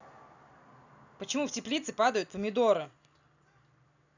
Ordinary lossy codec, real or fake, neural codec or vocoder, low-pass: none; real; none; 7.2 kHz